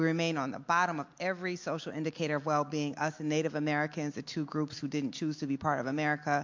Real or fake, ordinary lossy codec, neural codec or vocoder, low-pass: real; MP3, 48 kbps; none; 7.2 kHz